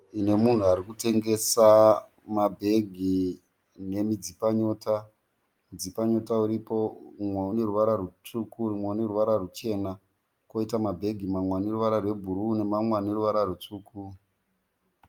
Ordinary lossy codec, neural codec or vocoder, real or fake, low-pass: Opus, 32 kbps; none; real; 19.8 kHz